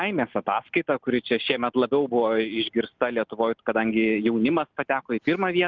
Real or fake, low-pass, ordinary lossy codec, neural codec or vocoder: real; 7.2 kHz; Opus, 24 kbps; none